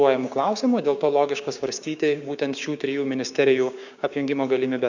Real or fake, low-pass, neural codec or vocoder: fake; 7.2 kHz; codec, 16 kHz, 6 kbps, DAC